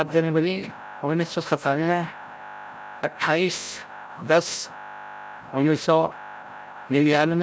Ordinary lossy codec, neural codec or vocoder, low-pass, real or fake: none; codec, 16 kHz, 0.5 kbps, FreqCodec, larger model; none; fake